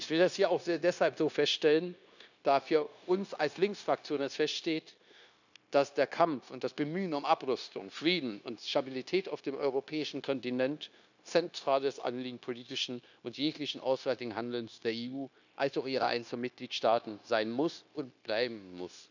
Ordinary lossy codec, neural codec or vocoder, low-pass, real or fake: none; codec, 16 kHz, 0.9 kbps, LongCat-Audio-Codec; 7.2 kHz; fake